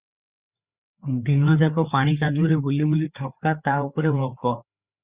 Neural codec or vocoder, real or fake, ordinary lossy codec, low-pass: codec, 16 kHz, 4 kbps, FreqCodec, larger model; fake; Opus, 64 kbps; 3.6 kHz